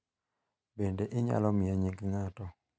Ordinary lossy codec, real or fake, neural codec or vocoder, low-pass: none; real; none; none